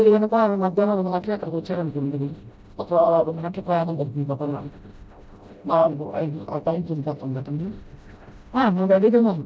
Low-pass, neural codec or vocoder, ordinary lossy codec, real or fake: none; codec, 16 kHz, 0.5 kbps, FreqCodec, smaller model; none; fake